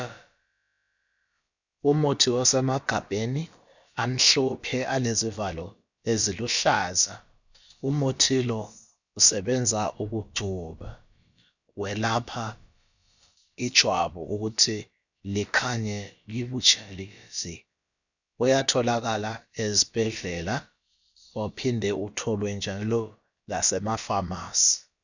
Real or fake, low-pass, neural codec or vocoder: fake; 7.2 kHz; codec, 16 kHz, about 1 kbps, DyCAST, with the encoder's durations